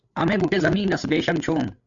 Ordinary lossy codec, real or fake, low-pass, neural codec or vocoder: AAC, 64 kbps; fake; 7.2 kHz; codec, 16 kHz, 8 kbps, FreqCodec, larger model